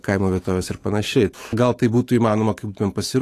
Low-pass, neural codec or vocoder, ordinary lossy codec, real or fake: 14.4 kHz; autoencoder, 48 kHz, 128 numbers a frame, DAC-VAE, trained on Japanese speech; AAC, 48 kbps; fake